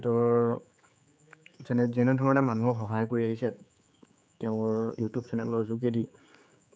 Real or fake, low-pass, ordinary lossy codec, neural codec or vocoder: fake; none; none; codec, 16 kHz, 4 kbps, X-Codec, HuBERT features, trained on general audio